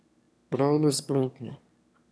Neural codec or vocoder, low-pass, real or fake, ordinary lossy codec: autoencoder, 22.05 kHz, a latent of 192 numbers a frame, VITS, trained on one speaker; none; fake; none